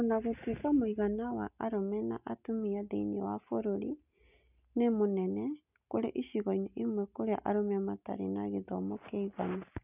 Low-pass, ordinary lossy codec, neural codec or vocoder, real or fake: 3.6 kHz; none; none; real